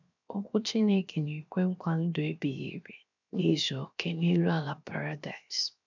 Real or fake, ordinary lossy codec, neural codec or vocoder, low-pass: fake; none; codec, 16 kHz, 0.7 kbps, FocalCodec; 7.2 kHz